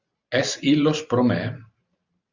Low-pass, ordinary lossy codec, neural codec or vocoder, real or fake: 7.2 kHz; Opus, 64 kbps; vocoder, 44.1 kHz, 128 mel bands every 512 samples, BigVGAN v2; fake